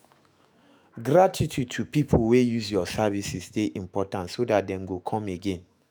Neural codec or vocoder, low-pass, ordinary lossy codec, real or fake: autoencoder, 48 kHz, 128 numbers a frame, DAC-VAE, trained on Japanese speech; none; none; fake